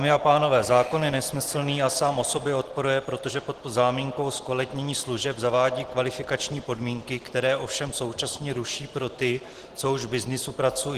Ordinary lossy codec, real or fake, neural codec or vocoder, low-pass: Opus, 16 kbps; real; none; 14.4 kHz